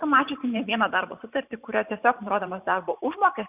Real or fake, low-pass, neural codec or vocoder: fake; 3.6 kHz; vocoder, 44.1 kHz, 128 mel bands every 512 samples, BigVGAN v2